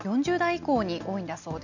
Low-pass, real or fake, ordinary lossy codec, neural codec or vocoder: 7.2 kHz; real; none; none